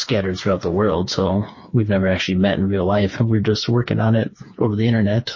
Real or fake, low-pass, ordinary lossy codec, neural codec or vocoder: fake; 7.2 kHz; MP3, 32 kbps; codec, 16 kHz, 4 kbps, FreqCodec, smaller model